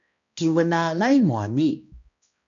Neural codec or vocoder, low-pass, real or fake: codec, 16 kHz, 1 kbps, X-Codec, HuBERT features, trained on balanced general audio; 7.2 kHz; fake